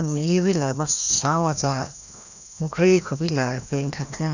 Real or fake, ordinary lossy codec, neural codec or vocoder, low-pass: fake; none; codec, 16 kHz, 1 kbps, FreqCodec, larger model; 7.2 kHz